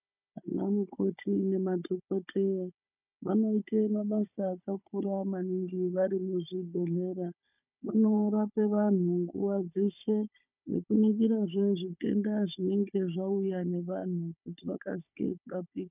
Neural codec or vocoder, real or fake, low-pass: codec, 16 kHz, 16 kbps, FunCodec, trained on Chinese and English, 50 frames a second; fake; 3.6 kHz